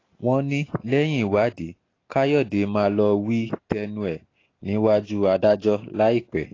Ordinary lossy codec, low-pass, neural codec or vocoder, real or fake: AAC, 32 kbps; 7.2 kHz; none; real